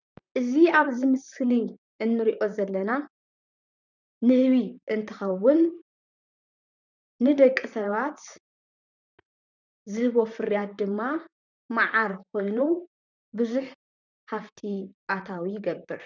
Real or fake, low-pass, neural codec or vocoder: fake; 7.2 kHz; vocoder, 44.1 kHz, 128 mel bands, Pupu-Vocoder